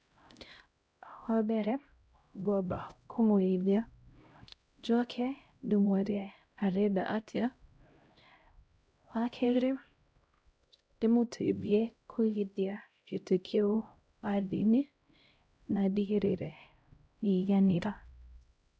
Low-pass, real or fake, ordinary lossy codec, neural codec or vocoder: none; fake; none; codec, 16 kHz, 0.5 kbps, X-Codec, HuBERT features, trained on LibriSpeech